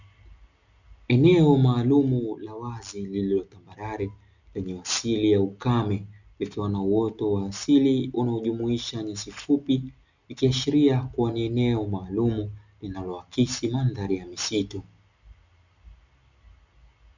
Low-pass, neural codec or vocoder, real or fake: 7.2 kHz; none; real